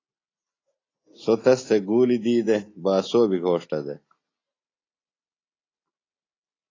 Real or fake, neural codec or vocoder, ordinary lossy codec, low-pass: real; none; AAC, 32 kbps; 7.2 kHz